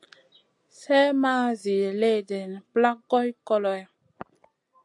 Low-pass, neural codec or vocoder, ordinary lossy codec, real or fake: 10.8 kHz; none; AAC, 64 kbps; real